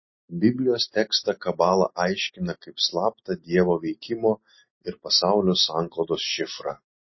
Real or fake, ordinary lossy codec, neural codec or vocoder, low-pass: real; MP3, 24 kbps; none; 7.2 kHz